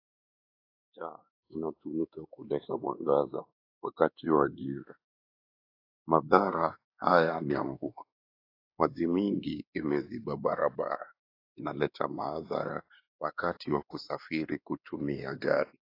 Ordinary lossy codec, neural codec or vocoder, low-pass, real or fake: AAC, 32 kbps; codec, 16 kHz, 2 kbps, X-Codec, WavLM features, trained on Multilingual LibriSpeech; 5.4 kHz; fake